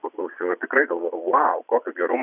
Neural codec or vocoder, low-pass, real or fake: vocoder, 22.05 kHz, 80 mel bands, Vocos; 3.6 kHz; fake